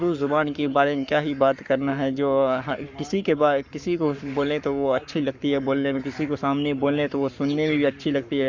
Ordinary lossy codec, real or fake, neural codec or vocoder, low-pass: Opus, 64 kbps; fake; codec, 44.1 kHz, 7.8 kbps, Pupu-Codec; 7.2 kHz